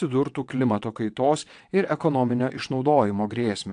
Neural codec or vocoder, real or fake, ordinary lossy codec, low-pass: vocoder, 22.05 kHz, 80 mel bands, WaveNeXt; fake; AAC, 64 kbps; 9.9 kHz